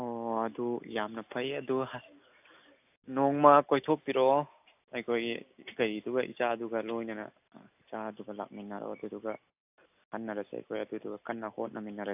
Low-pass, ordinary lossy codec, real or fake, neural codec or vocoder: 3.6 kHz; AAC, 32 kbps; real; none